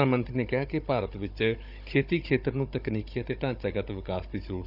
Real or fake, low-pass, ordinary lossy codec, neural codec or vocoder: fake; 5.4 kHz; none; codec, 16 kHz, 16 kbps, FunCodec, trained on Chinese and English, 50 frames a second